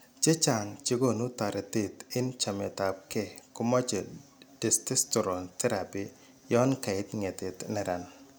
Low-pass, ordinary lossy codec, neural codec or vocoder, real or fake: none; none; none; real